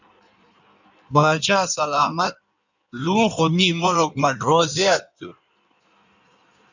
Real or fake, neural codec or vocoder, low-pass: fake; codec, 16 kHz in and 24 kHz out, 1.1 kbps, FireRedTTS-2 codec; 7.2 kHz